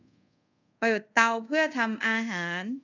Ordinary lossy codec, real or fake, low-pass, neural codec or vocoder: none; fake; 7.2 kHz; codec, 24 kHz, 0.5 kbps, DualCodec